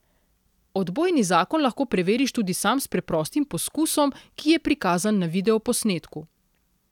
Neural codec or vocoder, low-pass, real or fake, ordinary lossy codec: none; 19.8 kHz; real; none